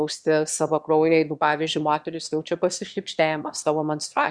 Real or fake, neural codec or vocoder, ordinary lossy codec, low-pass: fake; autoencoder, 22.05 kHz, a latent of 192 numbers a frame, VITS, trained on one speaker; Opus, 64 kbps; 9.9 kHz